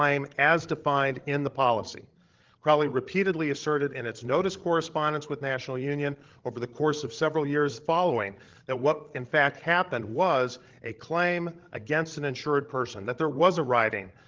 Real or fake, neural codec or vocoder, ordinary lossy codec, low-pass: fake; codec, 16 kHz, 16 kbps, FreqCodec, larger model; Opus, 16 kbps; 7.2 kHz